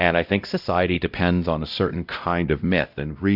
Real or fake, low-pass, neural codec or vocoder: fake; 5.4 kHz; codec, 16 kHz, 0.5 kbps, X-Codec, WavLM features, trained on Multilingual LibriSpeech